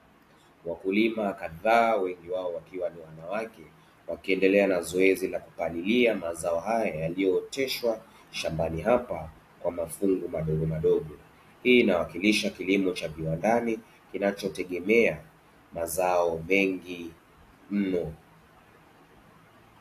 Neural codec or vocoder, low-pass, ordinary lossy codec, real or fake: none; 14.4 kHz; AAC, 64 kbps; real